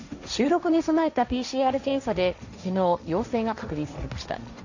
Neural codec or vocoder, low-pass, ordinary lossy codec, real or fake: codec, 16 kHz, 1.1 kbps, Voila-Tokenizer; 7.2 kHz; none; fake